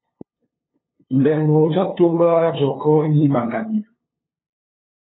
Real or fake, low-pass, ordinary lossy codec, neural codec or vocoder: fake; 7.2 kHz; AAC, 16 kbps; codec, 16 kHz, 2 kbps, FunCodec, trained on LibriTTS, 25 frames a second